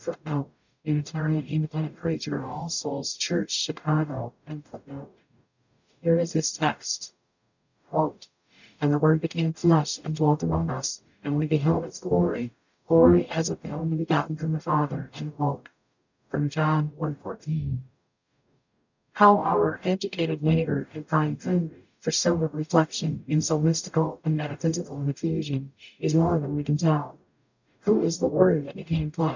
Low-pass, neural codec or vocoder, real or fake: 7.2 kHz; codec, 44.1 kHz, 0.9 kbps, DAC; fake